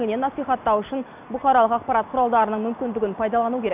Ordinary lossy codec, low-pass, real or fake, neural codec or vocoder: none; 3.6 kHz; real; none